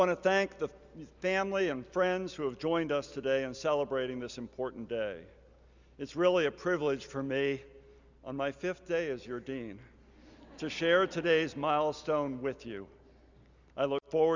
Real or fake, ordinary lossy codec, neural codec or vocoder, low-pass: real; Opus, 64 kbps; none; 7.2 kHz